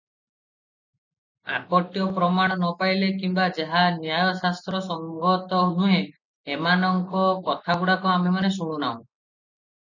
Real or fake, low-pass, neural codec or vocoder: real; 7.2 kHz; none